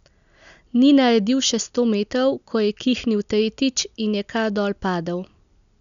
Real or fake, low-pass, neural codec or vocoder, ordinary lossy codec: real; 7.2 kHz; none; none